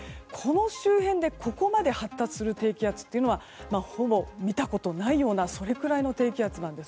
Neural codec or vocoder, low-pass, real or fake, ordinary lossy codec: none; none; real; none